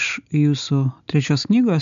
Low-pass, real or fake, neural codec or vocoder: 7.2 kHz; real; none